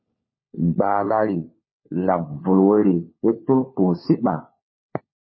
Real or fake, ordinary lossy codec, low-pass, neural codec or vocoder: fake; MP3, 24 kbps; 7.2 kHz; codec, 16 kHz, 4 kbps, FunCodec, trained on LibriTTS, 50 frames a second